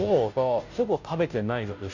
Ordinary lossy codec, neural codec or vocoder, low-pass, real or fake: none; codec, 16 kHz, 0.5 kbps, FunCodec, trained on Chinese and English, 25 frames a second; 7.2 kHz; fake